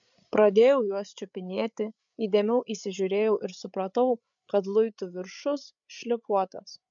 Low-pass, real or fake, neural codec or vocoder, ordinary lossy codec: 7.2 kHz; fake; codec, 16 kHz, 8 kbps, FreqCodec, larger model; MP3, 64 kbps